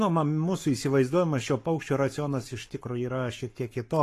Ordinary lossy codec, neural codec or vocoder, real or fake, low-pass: AAC, 48 kbps; codec, 44.1 kHz, 7.8 kbps, Pupu-Codec; fake; 14.4 kHz